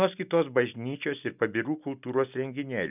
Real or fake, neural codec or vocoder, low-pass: real; none; 3.6 kHz